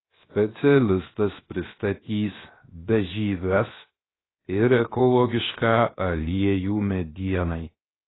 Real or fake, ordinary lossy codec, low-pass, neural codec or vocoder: fake; AAC, 16 kbps; 7.2 kHz; codec, 16 kHz, 0.7 kbps, FocalCodec